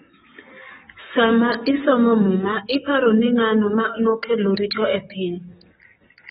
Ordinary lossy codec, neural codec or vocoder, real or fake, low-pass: AAC, 16 kbps; codec, 44.1 kHz, 7.8 kbps, DAC; fake; 19.8 kHz